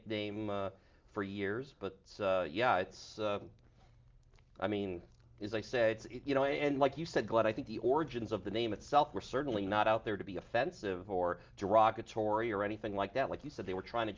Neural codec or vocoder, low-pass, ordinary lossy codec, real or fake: none; 7.2 kHz; Opus, 24 kbps; real